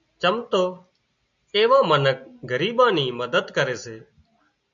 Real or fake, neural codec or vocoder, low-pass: real; none; 7.2 kHz